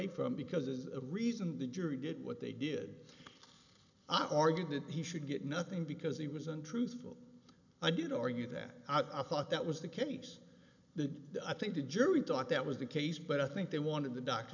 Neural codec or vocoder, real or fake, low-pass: none; real; 7.2 kHz